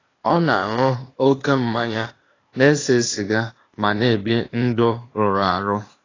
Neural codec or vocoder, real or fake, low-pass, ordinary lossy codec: codec, 16 kHz, 0.8 kbps, ZipCodec; fake; 7.2 kHz; AAC, 32 kbps